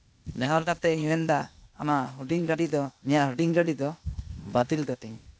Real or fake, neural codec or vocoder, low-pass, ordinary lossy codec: fake; codec, 16 kHz, 0.8 kbps, ZipCodec; none; none